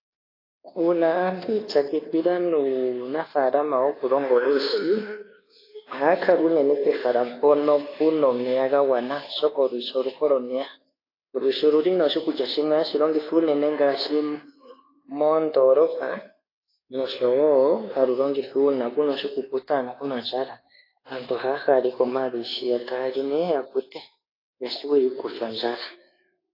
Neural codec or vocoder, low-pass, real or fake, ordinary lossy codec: codec, 24 kHz, 1.2 kbps, DualCodec; 5.4 kHz; fake; AAC, 24 kbps